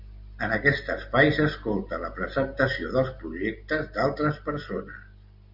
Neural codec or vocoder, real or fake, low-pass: none; real; 5.4 kHz